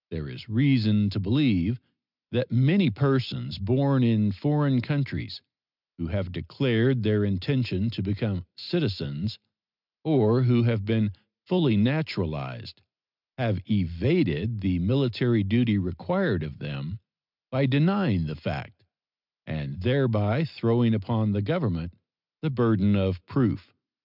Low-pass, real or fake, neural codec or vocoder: 5.4 kHz; real; none